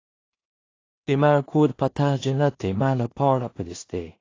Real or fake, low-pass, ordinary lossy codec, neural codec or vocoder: fake; 7.2 kHz; AAC, 32 kbps; codec, 16 kHz in and 24 kHz out, 0.4 kbps, LongCat-Audio-Codec, two codebook decoder